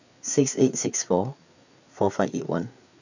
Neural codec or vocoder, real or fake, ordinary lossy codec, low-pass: codec, 16 kHz, 4 kbps, FreqCodec, larger model; fake; none; 7.2 kHz